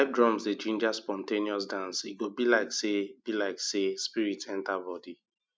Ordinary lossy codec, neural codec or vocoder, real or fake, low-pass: none; none; real; none